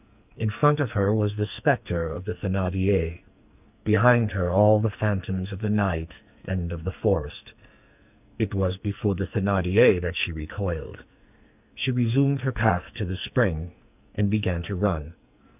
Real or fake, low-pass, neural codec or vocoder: fake; 3.6 kHz; codec, 44.1 kHz, 2.6 kbps, SNAC